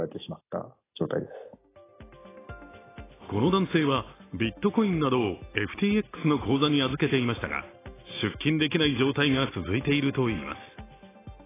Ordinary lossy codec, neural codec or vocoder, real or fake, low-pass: AAC, 16 kbps; none; real; 3.6 kHz